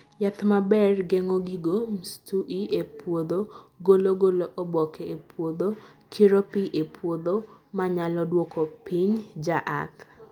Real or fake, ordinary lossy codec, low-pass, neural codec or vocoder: real; Opus, 32 kbps; 19.8 kHz; none